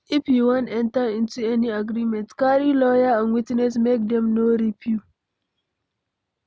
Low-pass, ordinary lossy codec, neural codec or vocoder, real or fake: none; none; none; real